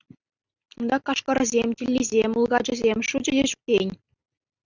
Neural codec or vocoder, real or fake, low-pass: none; real; 7.2 kHz